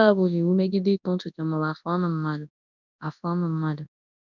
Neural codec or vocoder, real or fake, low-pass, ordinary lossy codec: codec, 24 kHz, 0.9 kbps, WavTokenizer, large speech release; fake; 7.2 kHz; none